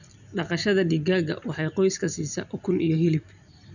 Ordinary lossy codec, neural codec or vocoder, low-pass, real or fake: none; none; 7.2 kHz; real